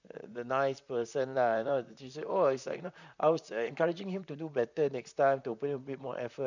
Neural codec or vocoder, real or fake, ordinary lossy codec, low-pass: vocoder, 44.1 kHz, 128 mel bands, Pupu-Vocoder; fake; none; 7.2 kHz